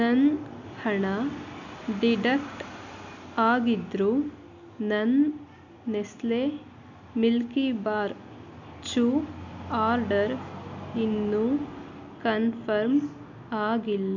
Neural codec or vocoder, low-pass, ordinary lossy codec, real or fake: none; 7.2 kHz; none; real